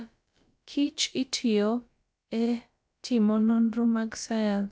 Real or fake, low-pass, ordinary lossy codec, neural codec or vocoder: fake; none; none; codec, 16 kHz, about 1 kbps, DyCAST, with the encoder's durations